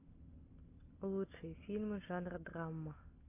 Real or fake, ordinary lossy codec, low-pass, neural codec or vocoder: real; AAC, 32 kbps; 3.6 kHz; none